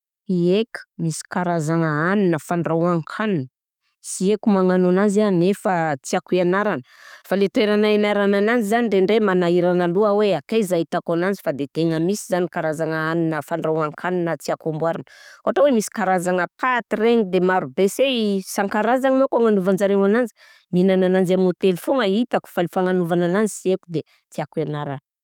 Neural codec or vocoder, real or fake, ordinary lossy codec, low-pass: codec, 44.1 kHz, 7.8 kbps, DAC; fake; none; 19.8 kHz